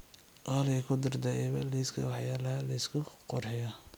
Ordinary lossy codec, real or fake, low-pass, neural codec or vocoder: none; real; none; none